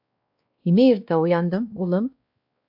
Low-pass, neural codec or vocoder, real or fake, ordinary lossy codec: 5.4 kHz; codec, 16 kHz, 1 kbps, X-Codec, WavLM features, trained on Multilingual LibriSpeech; fake; AAC, 48 kbps